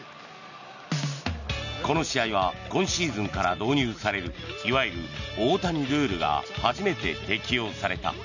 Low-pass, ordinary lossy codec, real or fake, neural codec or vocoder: 7.2 kHz; none; real; none